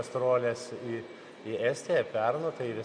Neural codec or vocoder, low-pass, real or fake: none; 9.9 kHz; real